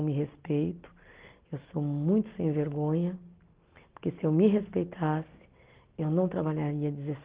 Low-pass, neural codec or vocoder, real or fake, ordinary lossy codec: 3.6 kHz; none; real; Opus, 16 kbps